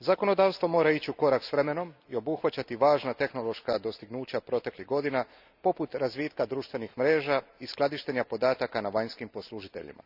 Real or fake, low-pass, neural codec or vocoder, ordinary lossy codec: real; 5.4 kHz; none; none